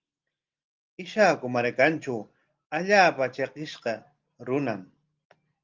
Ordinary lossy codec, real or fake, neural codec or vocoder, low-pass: Opus, 32 kbps; real; none; 7.2 kHz